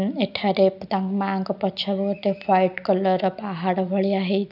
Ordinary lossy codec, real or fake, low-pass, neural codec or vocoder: none; real; 5.4 kHz; none